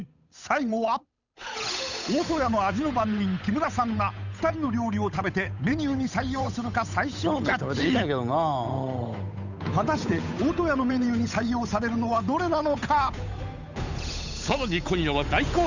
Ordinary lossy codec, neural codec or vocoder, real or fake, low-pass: none; codec, 16 kHz, 8 kbps, FunCodec, trained on Chinese and English, 25 frames a second; fake; 7.2 kHz